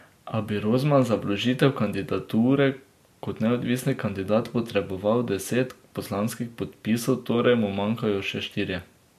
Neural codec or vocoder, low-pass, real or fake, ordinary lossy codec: none; 14.4 kHz; real; MP3, 64 kbps